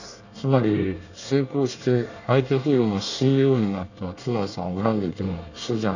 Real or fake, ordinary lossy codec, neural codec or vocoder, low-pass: fake; none; codec, 24 kHz, 1 kbps, SNAC; 7.2 kHz